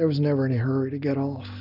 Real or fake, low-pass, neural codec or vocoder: real; 5.4 kHz; none